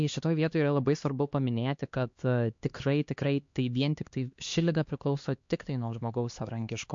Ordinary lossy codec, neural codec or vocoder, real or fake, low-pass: MP3, 48 kbps; codec, 16 kHz, 2 kbps, X-Codec, HuBERT features, trained on LibriSpeech; fake; 7.2 kHz